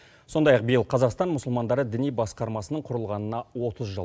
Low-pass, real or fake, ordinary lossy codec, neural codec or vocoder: none; real; none; none